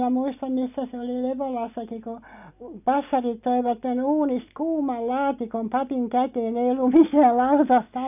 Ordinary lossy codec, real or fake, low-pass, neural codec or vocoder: none; real; 3.6 kHz; none